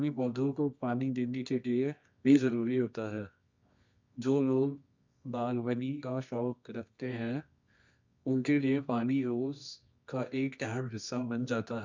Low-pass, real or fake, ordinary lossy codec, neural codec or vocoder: 7.2 kHz; fake; MP3, 64 kbps; codec, 24 kHz, 0.9 kbps, WavTokenizer, medium music audio release